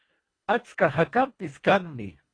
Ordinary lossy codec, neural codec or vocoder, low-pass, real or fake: AAC, 32 kbps; codec, 24 kHz, 1.5 kbps, HILCodec; 9.9 kHz; fake